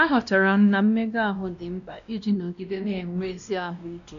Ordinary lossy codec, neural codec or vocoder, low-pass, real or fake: none; codec, 16 kHz, 1 kbps, X-Codec, WavLM features, trained on Multilingual LibriSpeech; 7.2 kHz; fake